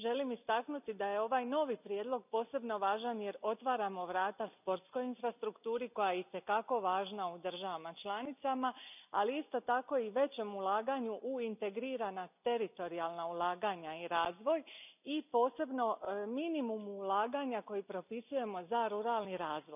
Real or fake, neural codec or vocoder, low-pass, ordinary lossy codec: real; none; 3.6 kHz; none